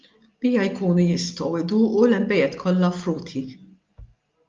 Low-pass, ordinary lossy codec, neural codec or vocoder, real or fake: 7.2 kHz; Opus, 32 kbps; none; real